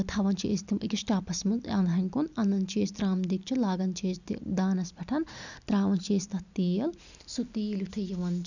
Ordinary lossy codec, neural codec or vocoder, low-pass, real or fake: none; none; 7.2 kHz; real